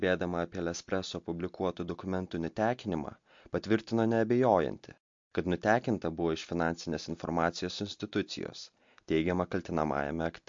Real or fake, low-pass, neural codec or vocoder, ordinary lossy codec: real; 7.2 kHz; none; MP3, 48 kbps